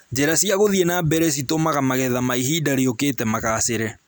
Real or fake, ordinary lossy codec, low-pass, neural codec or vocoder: real; none; none; none